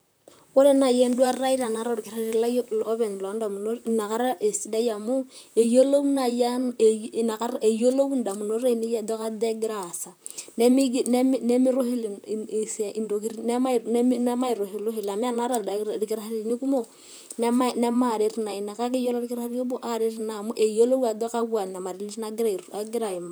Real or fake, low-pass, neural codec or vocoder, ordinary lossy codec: fake; none; vocoder, 44.1 kHz, 128 mel bands, Pupu-Vocoder; none